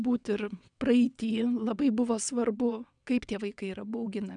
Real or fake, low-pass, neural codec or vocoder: fake; 9.9 kHz; vocoder, 22.05 kHz, 80 mel bands, WaveNeXt